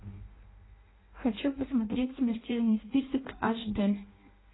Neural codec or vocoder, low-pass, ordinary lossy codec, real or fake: codec, 16 kHz in and 24 kHz out, 0.6 kbps, FireRedTTS-2 codec; 7.2 kHz; AAC, 16 kbps; fake